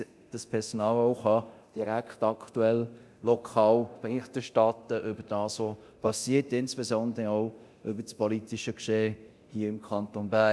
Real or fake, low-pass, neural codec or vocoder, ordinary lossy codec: fake; none; codec, 24 kHz, 0.9 kbps, DualCodec; none